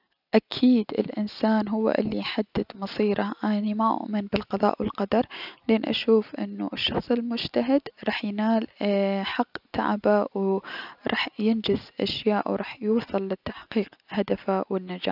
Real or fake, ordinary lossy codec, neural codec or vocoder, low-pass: real; none; none; 5.4 kHz